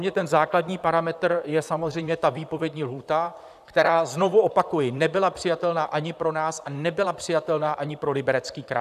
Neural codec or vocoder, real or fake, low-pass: vocoder, 44.1 kHz, 128 mel bands, Pupu-Vocoder; fake; 14.4 kHz